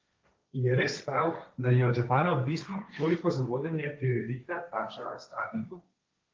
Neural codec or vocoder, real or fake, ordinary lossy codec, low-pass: codec, 16 kHz, 1.1 kbps, Voila-Tokenizer; fake; Opus, 32 kbps; 7.2 kHz